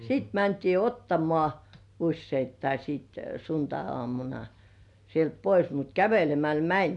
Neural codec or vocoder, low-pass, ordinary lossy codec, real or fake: none; 10.8 kHz; none; real